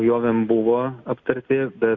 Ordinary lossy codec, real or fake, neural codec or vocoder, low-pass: Opus, 64 kbps; real; none; 7.2 kHz